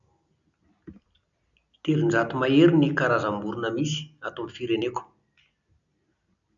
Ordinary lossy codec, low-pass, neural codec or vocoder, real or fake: none; 7.2 kHz; none; real